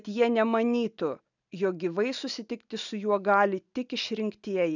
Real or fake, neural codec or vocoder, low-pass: real; none; 7.2 kHz